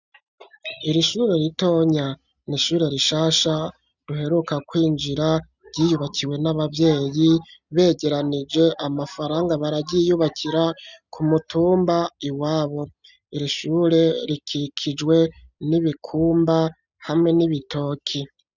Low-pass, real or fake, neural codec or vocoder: 7.2 kHz; real; none